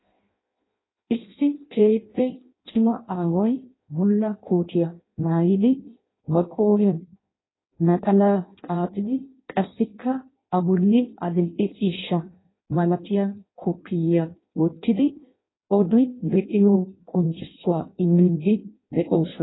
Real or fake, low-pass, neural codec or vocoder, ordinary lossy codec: fake; 7.2 kHz; codec, 16 kHz in and 24 kHz out, 0.6 kbps, FireRedTTS-2 codec; AAC, 16 kbps